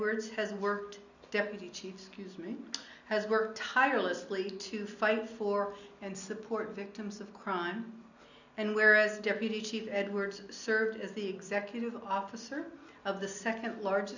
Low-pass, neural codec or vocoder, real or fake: 7.2 kHz; none; real